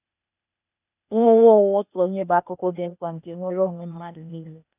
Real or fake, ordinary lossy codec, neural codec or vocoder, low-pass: fake; none; codec, 16 kHz, 0.8 kbps, ZipCodec; 3.6 kHz